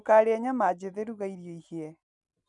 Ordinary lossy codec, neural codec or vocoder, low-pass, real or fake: none; none; 10.8 kHz; real